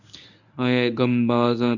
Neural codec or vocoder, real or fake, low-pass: codec, 24 kHz, 0.9 kbps, WavTokenizer, medium speech release version 1; fake; 7.2 kHz